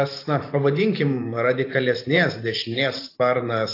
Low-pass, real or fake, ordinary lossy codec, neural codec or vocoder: 5.4 kHz; fake; AAC, 32 kbps; vocoder, 44.1 kHz, 128 mel bands every 512 samples, BigVGAN v2